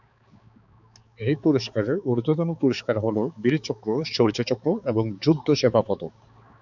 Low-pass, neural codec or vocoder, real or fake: 7.2 kHz; codec, 16 kHz, 4 kbps, X-Codec, HuBERT features, trained on balanced general audio; fake